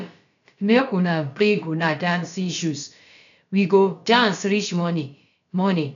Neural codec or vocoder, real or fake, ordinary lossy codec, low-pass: codec, 16 kHz, about 1 kbps, DyCAST, with the encoder's durations; fake; MP3, 96 kbps; 7.2 kHz